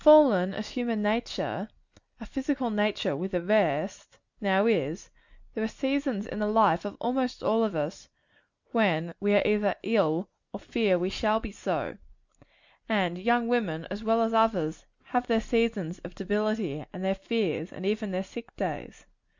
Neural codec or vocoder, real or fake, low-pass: none; real; 7.2 kHz